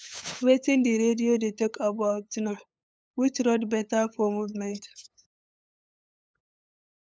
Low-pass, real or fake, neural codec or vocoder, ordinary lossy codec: none; fake; codec, 16 kHz, 8 kbps, FunCodec, trained on LibriTTS, 25 frames a second; none